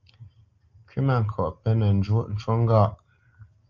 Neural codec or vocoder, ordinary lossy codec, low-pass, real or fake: none; Opus, 32 kbps; 7.2 kHz; real